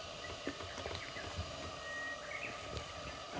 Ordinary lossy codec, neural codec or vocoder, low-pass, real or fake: none; none; none; real